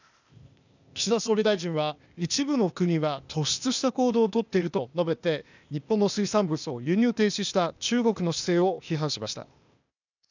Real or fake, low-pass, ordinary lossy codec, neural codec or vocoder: fake; 7.2 kHz; none; codec, 16 kHz, 0.8 kbps, ZipCodec